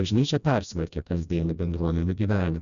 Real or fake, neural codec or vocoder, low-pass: fake; codec, 16 kHz, 1 kbps, FreqCodec, smaller model; 7.2 kHz